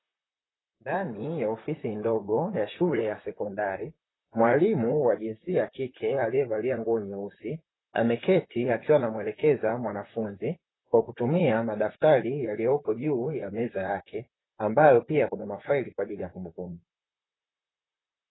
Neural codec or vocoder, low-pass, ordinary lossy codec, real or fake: vocoder, 44.1 kHz, 128 mel bands, Pupu-Vocoder; 7.2 kHz; AAC, 16 kbps; fake